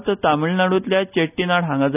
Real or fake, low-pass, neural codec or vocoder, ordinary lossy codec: real; 3.6 kHz; none; none